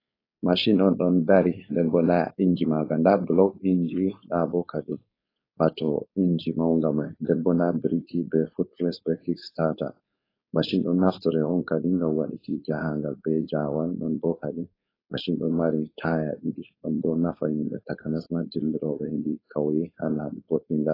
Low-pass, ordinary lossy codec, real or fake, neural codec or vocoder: 5.4 kHz; AAC, 24 kbps; fake; codec, 16 kHz, 4.8 kbps, FACodec